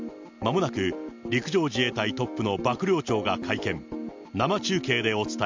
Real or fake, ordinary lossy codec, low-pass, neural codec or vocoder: real; MP3, 64 kbps; 7.2 kHz; none